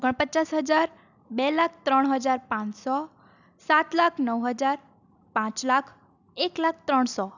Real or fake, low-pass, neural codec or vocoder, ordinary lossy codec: real; 7.2 kHz; none; none